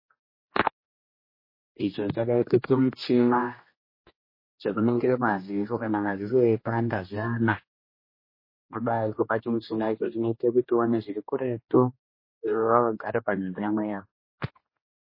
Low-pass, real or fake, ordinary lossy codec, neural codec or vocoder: 5.4 kHz; fake; MP3, 24 kbps; codec, 16 kHz, 1 kbps, X-Codec, HuBERT features, trained on general audio